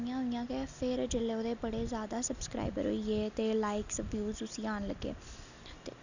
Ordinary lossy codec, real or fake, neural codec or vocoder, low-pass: none; real; none; 7.2 kHz